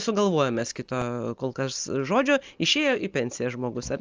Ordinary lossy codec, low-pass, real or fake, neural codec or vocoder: Opus, 24 kbps; 7.2 kHz; real; none